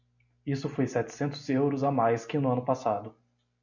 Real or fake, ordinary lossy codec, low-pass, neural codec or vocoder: real; MP3, 64 kbps; 7.2 kHz; none